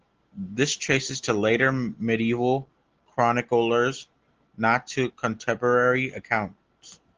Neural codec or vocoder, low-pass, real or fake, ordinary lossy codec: none; 7.2 kHz; real; Opus, 16 kbps